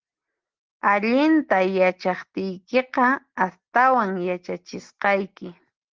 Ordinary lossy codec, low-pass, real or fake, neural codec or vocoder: Opus, 16 kbps; 7.2 kHz; real; none